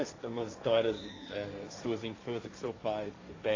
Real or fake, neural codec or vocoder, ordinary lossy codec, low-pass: fake; codec, 16 kHz, 1.1 kbps, Voila-Tokenizer; AAC, 32 kbps; 7.2 kHz